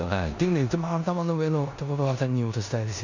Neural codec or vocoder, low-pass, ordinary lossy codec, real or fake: codec, 16 kHz in and 24 kHz out, 0.9 kbps, LongCat-Audio-Codec, four codebook decoder; 7.2 kHz; none; fake